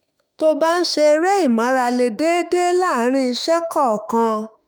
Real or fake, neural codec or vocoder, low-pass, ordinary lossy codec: fake; autoencoder, 48 kHz, 32 numbers a frame, DAC-VAE, trained on Japanese speech; none; none